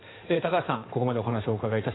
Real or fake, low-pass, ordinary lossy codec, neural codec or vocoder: fake; 7.2 kHz; AAC, 16 kbps; codec, 16 kHz in and 24 kHz out, 2.2 kbps, FireRedTTS-2 codec